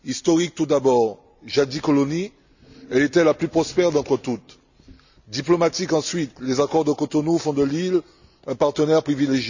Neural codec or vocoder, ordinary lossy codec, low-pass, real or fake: none; none; 7.2 kHz; real